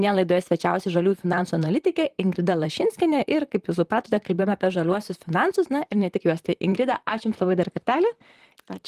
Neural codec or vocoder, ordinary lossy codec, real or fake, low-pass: vocoder, 44.1 kHz, 128 mel bands, Pupu-Vocoder; Opus, 24 kbps; fake; 14.4 kHz